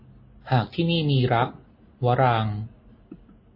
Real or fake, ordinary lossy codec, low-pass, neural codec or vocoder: real; MP3, 24 kbps; 5.4 kHz; none